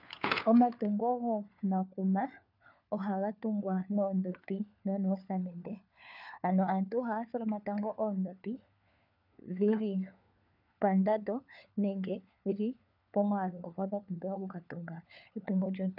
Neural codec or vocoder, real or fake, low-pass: codec, 16 kHz, 4 kbps, FunCodec, trained on LibriTTS, 50 frames a second; fake; 5.4 kHz